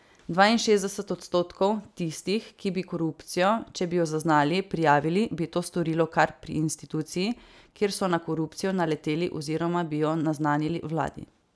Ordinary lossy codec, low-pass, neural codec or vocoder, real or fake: none; none; none; real